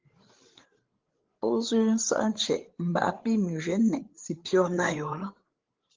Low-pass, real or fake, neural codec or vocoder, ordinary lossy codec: 7.2 kHz; fake; codec, 16 kHz, 8 kbps, FreqCodec, larger model; Opus, 16 kbps